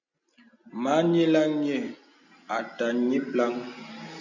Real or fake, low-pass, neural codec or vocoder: real; 7.2 kHz; none